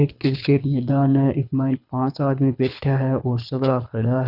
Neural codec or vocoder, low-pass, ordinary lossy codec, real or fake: codec, 24 kHz, 6 kbps, HILCodec; 5.4 kHz; none; fake